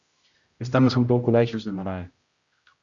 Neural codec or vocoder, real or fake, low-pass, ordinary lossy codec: codec, 16 kHz, 0.5 kbps, X-Codec, HuBERT features, trained on general audio; fake; 7.2 kHz; Opus, 64 kbps